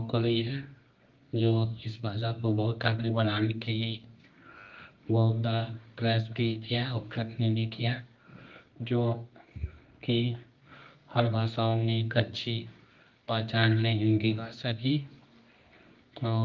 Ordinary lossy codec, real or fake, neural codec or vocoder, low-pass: Opus, 32 kbps; fake; codec, 24 kHz, 0.9 kbps, WavTokenizer, medium music audio release; 7.2 kHz